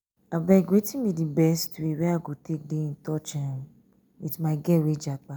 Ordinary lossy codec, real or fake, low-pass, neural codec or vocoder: none; real; none; none